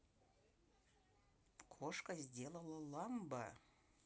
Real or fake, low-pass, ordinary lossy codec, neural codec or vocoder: real; none; none; none